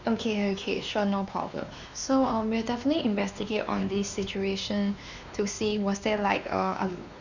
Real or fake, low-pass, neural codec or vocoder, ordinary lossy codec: fake; 7.2 kHz; codec, 16 kHz, 2 kbps, X-Codec, WavLM features, trained on Multilingual LibriSpeech; none